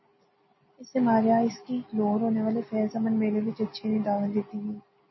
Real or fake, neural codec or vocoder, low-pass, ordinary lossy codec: real; none; 7.2 kHz; MP3, 24 kbps